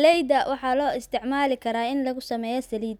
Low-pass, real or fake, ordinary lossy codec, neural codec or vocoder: 19.8 kHz; real; none; none